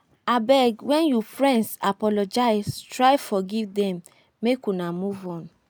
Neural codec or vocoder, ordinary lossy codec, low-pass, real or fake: none; none; none; real